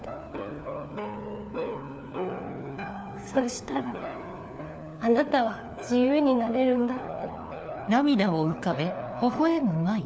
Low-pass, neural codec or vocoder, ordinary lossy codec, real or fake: none; codec, 16 kHz, 4 kbps, FunCodec, trained on LibriTTS, 50 frames a second; none; fake